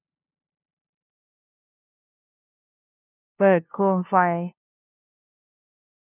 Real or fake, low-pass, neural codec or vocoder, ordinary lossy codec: fake; 3.6 kHz; codec, 16 kHz, 0.5 kbps, FunCodec, trained on LibriTTS, 25 frames a second; none